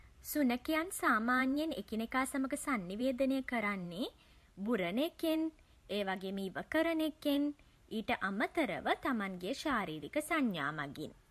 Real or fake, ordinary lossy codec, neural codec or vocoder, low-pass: fake; MP3, 64 kbps; vocoder, 44.1 kHz, 128 mel bands every 512 samples, BigVGAN v2; 14.4 kHz